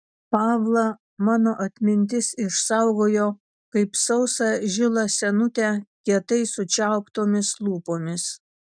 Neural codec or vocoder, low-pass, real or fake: none; 9.9 kHz; real